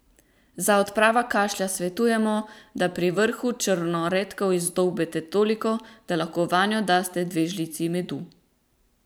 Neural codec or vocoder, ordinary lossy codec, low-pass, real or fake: vocoder, 44.1 kHz, 128 mel bands every 512 samples, BigVGAN v2; none; none; fake